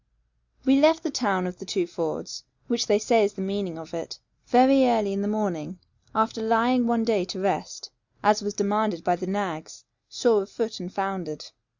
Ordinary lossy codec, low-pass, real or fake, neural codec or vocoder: Opus, 64 kbps; 7.2 kHz; real; none